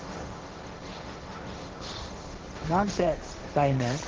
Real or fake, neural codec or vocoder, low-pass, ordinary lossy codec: fake; codec, 16 kHz, 1.1 kbps, Voila-Tokenizer; 7.2 kHz; Opus, 16 kbps